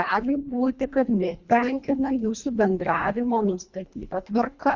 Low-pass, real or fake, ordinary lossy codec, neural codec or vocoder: 7.2 kHz; fake; Opus, 64 kbps; codec, 24 kHz, 1.5 kbps, HILCodec